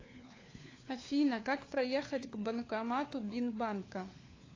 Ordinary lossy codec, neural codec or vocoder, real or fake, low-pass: AAC, 32 kbps; codec, 16 kHz, 4 kbps, FunCodec, trained on LibriTTS, 50 frames a second; fake; 7.2 kHz